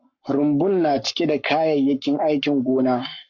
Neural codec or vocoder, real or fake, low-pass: codec, 44.1 kHz, 7.8 kbps, Pupu-Codec; fake; 7.2 kHz